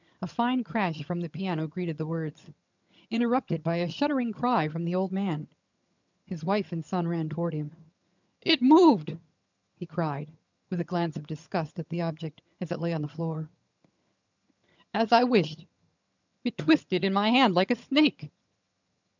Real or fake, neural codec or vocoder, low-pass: fake; vocoder, 22.05 kHz, 80 mel bands, HiFi-GAN; 7.2 kHz